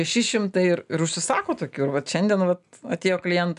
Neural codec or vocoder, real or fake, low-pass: none; real; 10.8 kHz